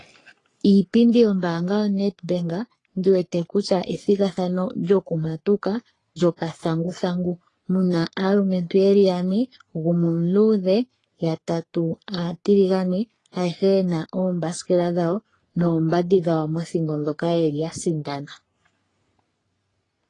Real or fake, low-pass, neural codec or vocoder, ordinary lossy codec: fake; 10.8 kHz; codec, 44.1 kHz, 3.4 kbps, Pupu-Codec; AAC, 32 kbps